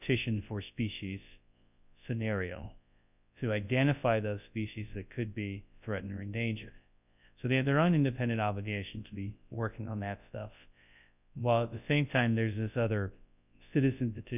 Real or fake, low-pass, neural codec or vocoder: fake; 3.6 kHz; codec, 24 kHz, 0.9 kbps, WavTokenizer, large speech release